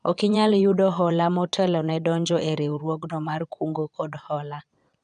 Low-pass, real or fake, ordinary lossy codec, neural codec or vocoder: 9.9 kHz; fake; none; vocoder, 22.05 kHz, 80 mel bands, Vocos